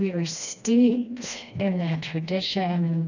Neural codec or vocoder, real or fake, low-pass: codec, 16 kHz, 1 kbps, FreqCodec, smaller model; fake; 7.2 kHz